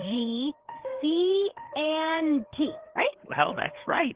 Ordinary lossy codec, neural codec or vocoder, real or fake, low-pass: Opus, 16 kbps; codec, 16 kHz, 4 kbps, FreqCodec, larger model; fake; 3.6 kHz